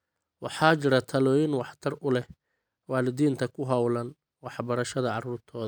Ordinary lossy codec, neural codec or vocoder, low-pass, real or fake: none; none; none; real